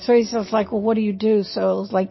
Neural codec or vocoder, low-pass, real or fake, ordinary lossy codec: none; 7.2 kHz; real; MP3, 24 kbps